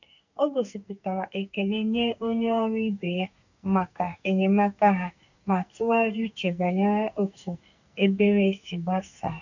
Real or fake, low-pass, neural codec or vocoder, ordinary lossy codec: fake; 7.2 kHz; codec, 44.1 kHz, 2.6 kbps, SNAC; none